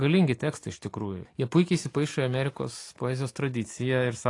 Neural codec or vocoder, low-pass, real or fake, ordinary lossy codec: none; 10.8 kHz; real; AAC, 48 kbps